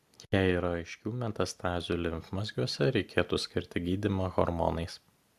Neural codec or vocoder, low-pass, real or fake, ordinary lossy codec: none; 14.4 kHz; real; AAC, 96 kbps